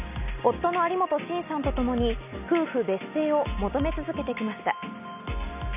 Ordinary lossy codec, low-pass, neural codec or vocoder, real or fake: none; 3.6 kHz; none; real